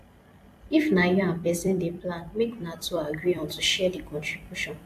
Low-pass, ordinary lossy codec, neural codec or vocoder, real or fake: 14.4 kHz; AAC, 64 kbps; vocoder, 44.1 kHz, 128 mel bands every 256 samples, BigVGAN v2; fake